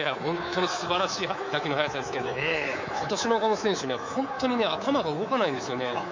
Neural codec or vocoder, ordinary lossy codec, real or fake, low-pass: codec, 24 kHz, 3.1 kbps, DualCodec; MP3, 48 kbps; fake; 7.2 kHz